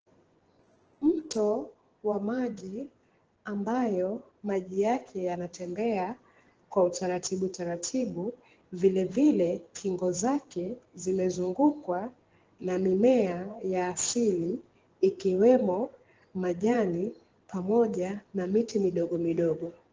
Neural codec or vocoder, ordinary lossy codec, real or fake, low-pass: vocoder, 24 kHz, 100 mel bands, Vocos; Opus, 16 kbps; fake; 7.2 kHz